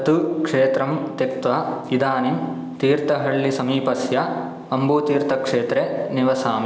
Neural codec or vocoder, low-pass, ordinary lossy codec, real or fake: none; none; none; real